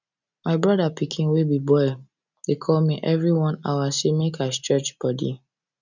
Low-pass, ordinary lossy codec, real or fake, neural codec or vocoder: 7.2 kHz; none; real; none